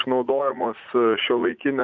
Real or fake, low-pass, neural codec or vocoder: fake; 7.2 kHz; vocoder, 44.1 kHz, 80 mel bands, Vocos